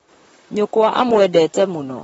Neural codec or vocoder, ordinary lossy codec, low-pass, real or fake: vocoder, 44.1 kHz, 128 mel bands, Pupu-Vocoder; AAC, 24 kbps; 19.8 kHz; fake